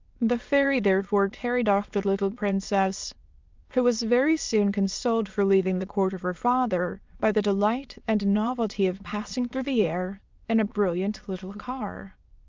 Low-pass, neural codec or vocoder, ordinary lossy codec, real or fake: 7.2 kHz; autoencoder, 22.05 kHz, a latent of 192 numbers a frame, VITS, trained on many speakers; Opus, 24 kbps; fake